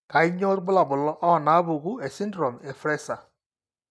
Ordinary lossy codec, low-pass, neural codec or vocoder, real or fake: none; none; none; real